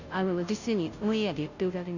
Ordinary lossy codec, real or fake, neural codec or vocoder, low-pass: none; fake; codec, 16 kHz, 0.5 kbps, FunCodec, trained on Chinese and English, 25 frames a second; 7.2 kHz